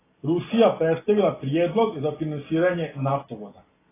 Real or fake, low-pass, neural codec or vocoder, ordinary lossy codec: real; 3.6 kHz; none; AAC, 16 kbps